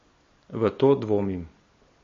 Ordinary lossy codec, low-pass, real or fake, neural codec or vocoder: MP3, 32 kbps; 7.2 kHz; real; none